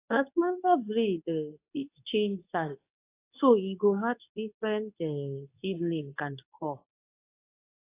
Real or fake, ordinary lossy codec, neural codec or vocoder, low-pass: fake; AAC, 24 kbps; codec, 24 kHz, 0.9 kbps, WavTokenizer, medium speech release version 2; 3.6 kHz